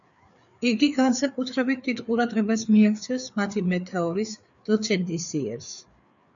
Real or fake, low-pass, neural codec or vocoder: fake; 7.2 kHz; codec, 16 kHz, 4 kbps, FreqCodec, larger model